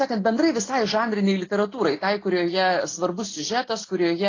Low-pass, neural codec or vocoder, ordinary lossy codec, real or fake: 7.2 kHz; none; AAC, 32 kbps; real